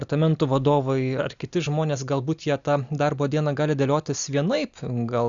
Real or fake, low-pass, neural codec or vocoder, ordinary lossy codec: real; 7.2 kHz; none; Opus, 64 kbps